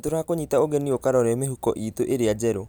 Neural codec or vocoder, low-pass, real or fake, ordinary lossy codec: none; none; real; none